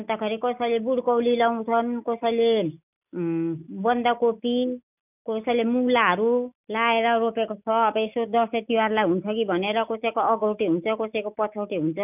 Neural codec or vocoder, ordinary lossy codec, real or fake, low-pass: none; none; real; 3.6 kHz